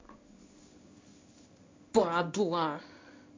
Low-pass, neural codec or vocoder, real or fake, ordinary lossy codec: 7.2 kHz; codec, 16 kHz in and 24 kHz out, 1 kbps, XY-Tokenizer; fake; MP3, 64 kbps